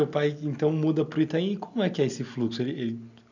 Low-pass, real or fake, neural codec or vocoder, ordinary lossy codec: 7.2 kHz; real; none; none